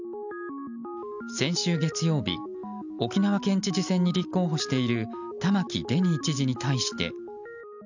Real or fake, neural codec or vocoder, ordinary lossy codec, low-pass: real; none; none; 7.2 kHz